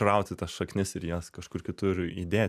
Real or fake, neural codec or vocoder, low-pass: real; none; 14.4 kHz